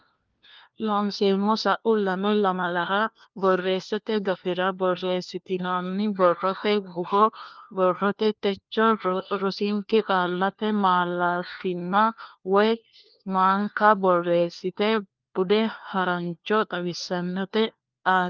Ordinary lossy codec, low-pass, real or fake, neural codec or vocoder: Opus, 24 kbps; 7.2 kHz; fake; codec, 16 kHz, 1 kbps, FunCodec, trained on LibriTTS, 50 frames a second